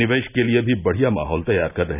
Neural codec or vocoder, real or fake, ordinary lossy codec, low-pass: none; real; none; 3.6 kHz